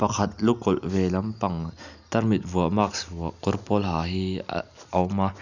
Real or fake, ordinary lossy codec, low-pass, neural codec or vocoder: real; none; 7.2 kHz; none